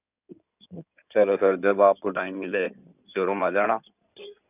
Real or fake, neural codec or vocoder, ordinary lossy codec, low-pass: fake; codec, 16 kHz in and 24 kHz out, 2.2 kbps, FireRedTTS-2 codec; none; 3.6 kHz